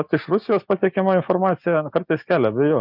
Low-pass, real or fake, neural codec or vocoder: 5.4 kHz; real; none